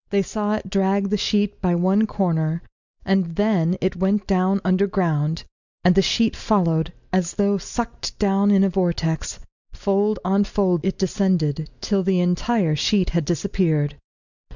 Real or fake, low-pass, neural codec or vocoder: real; 7.2 kHz; none